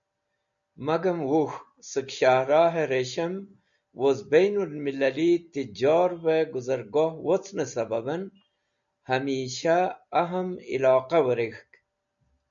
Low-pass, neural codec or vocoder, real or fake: 7.2 kHz; none; real